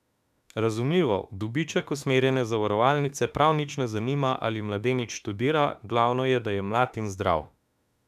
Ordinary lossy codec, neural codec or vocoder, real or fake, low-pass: none; autoencoder, 48 kHz, 32 numbers a frame, DAC-VAE, trained on Japanese speech; fake; 14.4 kHz